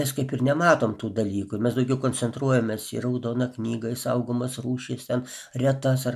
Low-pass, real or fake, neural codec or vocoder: 14.4 kHz; real; none